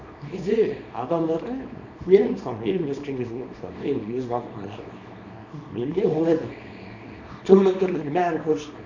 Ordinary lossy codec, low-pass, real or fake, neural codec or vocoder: none; 7.2 kHz; fake; codec, 24 kHz, 0.9 kbps, WavTokenizer, small release